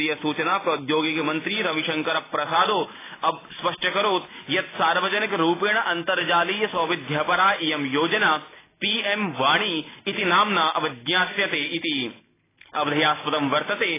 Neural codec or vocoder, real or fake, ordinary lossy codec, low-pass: none; real; AAC, 16 kbps; 3.6 kHz